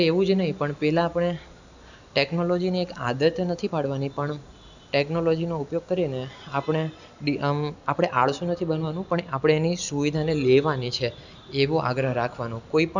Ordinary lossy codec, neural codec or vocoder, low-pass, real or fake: none; none; 7.2 kHz; real